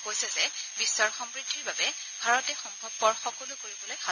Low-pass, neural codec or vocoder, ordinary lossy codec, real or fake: 7.2 kHz; none; none; real